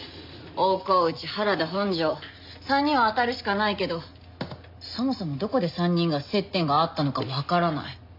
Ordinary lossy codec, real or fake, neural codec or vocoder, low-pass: MP3, 48 kbps; real; none; 5.4 kHz